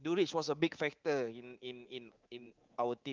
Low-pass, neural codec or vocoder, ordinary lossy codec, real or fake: 7.2 kHz; codec, 24 kHz, 3.1 kbps, DualCodec; Opus, 32 kbps; fake